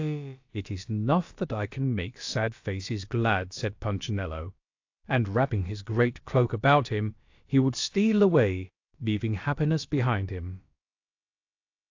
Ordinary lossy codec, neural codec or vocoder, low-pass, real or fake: AAC, 48 kbps; codec, 16 kHz, about 1 kbps, DyCAST, with the encoder's durations; 7.2 kHz; fake